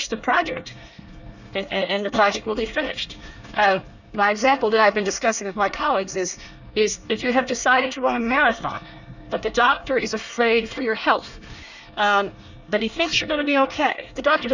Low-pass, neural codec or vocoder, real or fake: 7.2 kHz; codec, 24 kHz, 1 kbps, SNAC; fake